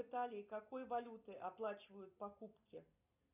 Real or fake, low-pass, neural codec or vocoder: real; 3.6 kHz; none